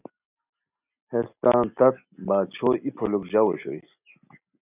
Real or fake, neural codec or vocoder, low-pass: real; none; 3.6 kHz